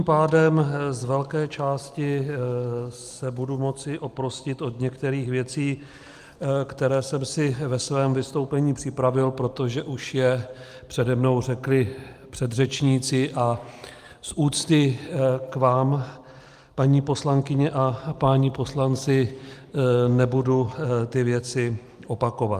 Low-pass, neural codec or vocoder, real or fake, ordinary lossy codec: 14.4 kHz; none; real; Opus, 32 kbps